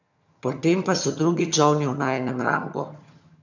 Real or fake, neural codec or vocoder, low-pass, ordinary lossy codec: fake; vocoder, 22.05 kHz, 80 mel bands, HiFi-GAN; 7.2 kHz; none